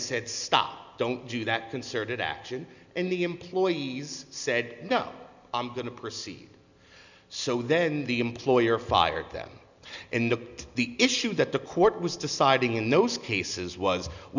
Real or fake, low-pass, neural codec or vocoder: real; 7.2 kHz; none